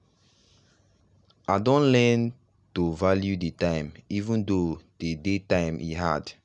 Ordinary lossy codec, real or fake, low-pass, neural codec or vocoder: none; real; 10.8 kHz; none